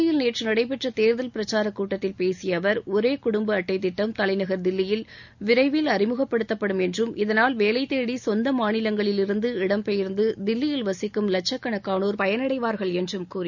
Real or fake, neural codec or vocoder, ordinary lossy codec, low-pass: real; none; none; 7.2 kHz